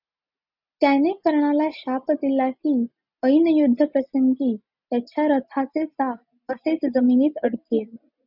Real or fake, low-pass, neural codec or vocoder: real; 5.4 kHz; none